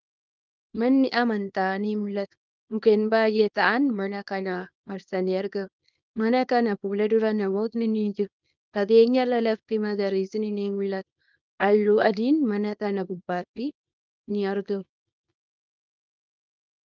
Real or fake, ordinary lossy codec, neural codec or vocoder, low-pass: fake; Opus, 24 kbps; codec, 24 kHz, 0.9 kbps, WavTokenizer, small release; 7.2 kHz